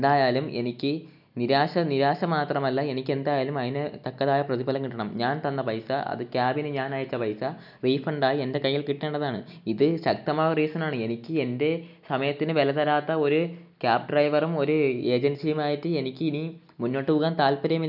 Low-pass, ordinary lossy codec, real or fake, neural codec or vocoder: 5.4 kHz; none; real; none